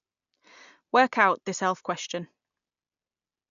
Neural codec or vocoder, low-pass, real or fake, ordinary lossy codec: none; 7.2 kHz; real; none